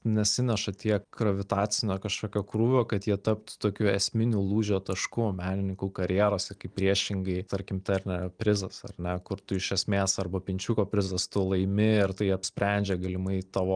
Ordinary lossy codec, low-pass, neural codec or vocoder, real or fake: Opus, 32 kbps; 9.9 kHz; none; real